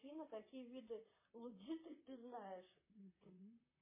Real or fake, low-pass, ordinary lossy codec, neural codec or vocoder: fake; 3.6 kHz; MP3, 16 kbps; vocoder, 44.1 kHz, 128 mel bands, Pupu-Vocoder